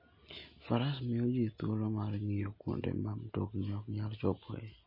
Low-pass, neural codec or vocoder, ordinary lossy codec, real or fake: 5.4 kHz; none; MP3, 24 kbps; real